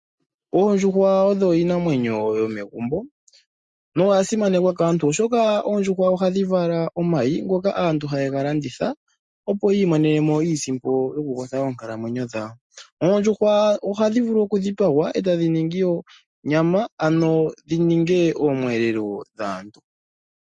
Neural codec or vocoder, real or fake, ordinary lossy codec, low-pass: none; real; MP3, 48 kbps; 10.8 kHz